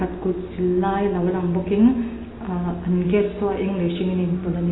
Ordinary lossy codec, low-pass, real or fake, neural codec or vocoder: AAC, 16 kbps; 7.2 kHz; real; none